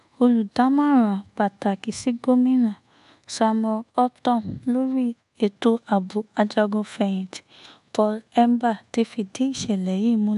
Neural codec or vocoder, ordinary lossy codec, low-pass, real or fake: codec, 24 kHz, 1.2 kbps, DualCodec; none; 10.8 kHz; fake